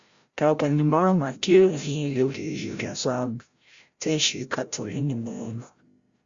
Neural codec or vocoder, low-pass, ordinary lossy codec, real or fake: codec, 16 kHz, 0.5 kbps, FreqCodec, larger model; 7.2 kHz; Opus, 64 kbps; fake